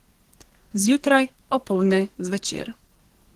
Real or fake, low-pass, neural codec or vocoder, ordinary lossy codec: fake; 14.4 kHz; codec, 44.1 kHz, 2.6 kbps, SNAC; Opus, 16 kbps